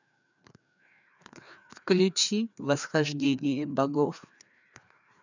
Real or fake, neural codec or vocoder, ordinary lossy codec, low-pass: fake; codec, 16 kHz, 2 kbps, FreqCodec, larger model; none; 7.2 kHz